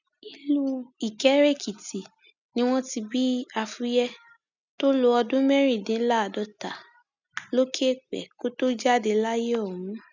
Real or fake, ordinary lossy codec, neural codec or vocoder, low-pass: real; none; none; 7.2 kHz